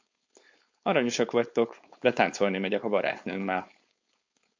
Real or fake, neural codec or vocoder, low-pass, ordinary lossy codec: fake; codec, 16 kHz, 4.8 kbps, FACodec; 7.2 kHz; MP3, 64 kbps